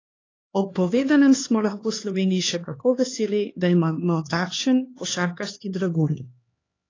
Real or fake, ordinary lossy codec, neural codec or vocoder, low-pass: fake; AAC, 32 kbps; codec, 16 kHz, 2 kbps, X-Codec, HuBERT features, trained on LibriSpeech; 7.2 kHz